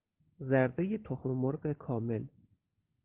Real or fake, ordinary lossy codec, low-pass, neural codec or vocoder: real; Opus, 16 kbps; 3.6 kHz; none